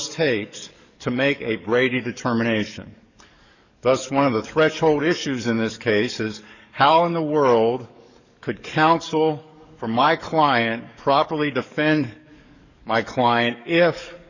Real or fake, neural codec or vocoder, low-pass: fake; codec, 44.1 kHz, 7.8 kbps, DAC; 7.2 kHz